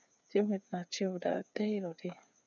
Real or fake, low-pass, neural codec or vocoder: fake; 7.2 kHz; codec, 16 kHz, 8 kbps, FreqCodec, smaller model